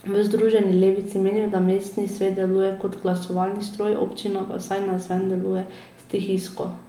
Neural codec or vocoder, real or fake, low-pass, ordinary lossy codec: none; real; 19.8 kHz; Opus, 32 kbps